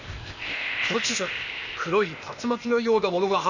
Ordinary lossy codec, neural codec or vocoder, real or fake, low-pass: none; codec, 16 kHz, 0.8 kbps, ZipCodec; fake; 7.2 kHz